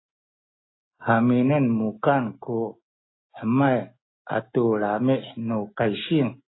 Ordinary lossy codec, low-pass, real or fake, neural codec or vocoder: AAC, 16 kbps; 7.2 kHz; real; none